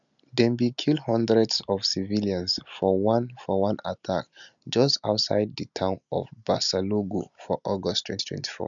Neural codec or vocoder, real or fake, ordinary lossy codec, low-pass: none; real; none; 7.2 kHz